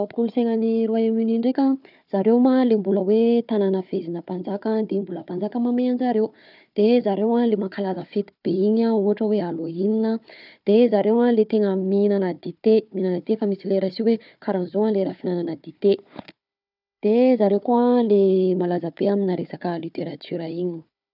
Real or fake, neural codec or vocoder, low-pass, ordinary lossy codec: fake; codec, 16 kHz, 4 kbps, FunCodec, trained on Chinese and English, 50 frames a second; 5.4 kHz; none